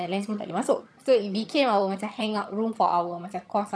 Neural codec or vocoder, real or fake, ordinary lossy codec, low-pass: vocoder, 22.05 kHz, 80 mel bands, HiFi-GAN; fake; none; none